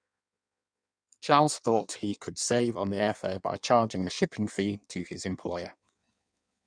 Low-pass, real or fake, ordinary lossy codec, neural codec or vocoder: 9.9 kHz; fake; none; codec, 16 kHz in and 24 kHz out, 1.1 kbps, FireRedTTS-2 codec